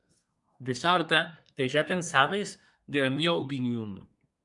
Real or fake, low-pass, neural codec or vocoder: fake; 10.8 kHz; codec, 24 kHz, 1 kbps, SNAC